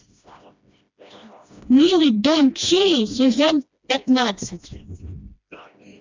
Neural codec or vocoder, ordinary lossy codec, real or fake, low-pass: codec, 16 kHz, 1 kbps, FreqCodec, smaller model; MP3, 64 kbps; fake; 7.2 kHz